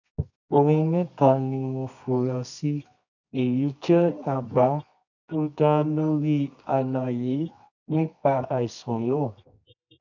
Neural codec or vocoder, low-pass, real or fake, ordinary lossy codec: codec, 24 kHz, 0.9 kbps, WavTokenizer, medium music audio release; 7.2 kHz; fake; none